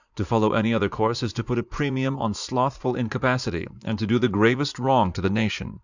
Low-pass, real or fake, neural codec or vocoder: 7.2 kHz; fake; vocoder, 44.1 kHz, 80 mel bands, Vocos